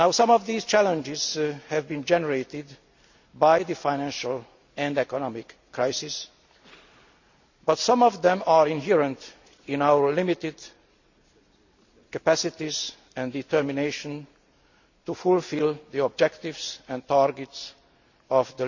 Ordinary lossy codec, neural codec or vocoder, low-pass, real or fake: none; none; 7.2 kHz; real